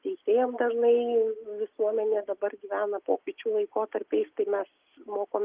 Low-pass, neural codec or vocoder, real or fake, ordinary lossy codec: 3.6 kHz; none; real; Opus, 32 kbps